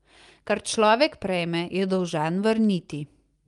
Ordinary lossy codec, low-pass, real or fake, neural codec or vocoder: Opus, 32 kbps; 10.8 kHz; real; none